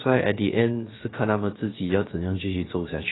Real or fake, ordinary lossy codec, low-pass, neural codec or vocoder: fake; AAC, 16 kbps; 7.2 kHz; codec, 16 kHz, about 1 kbps, DyCAST, with the encoder's durations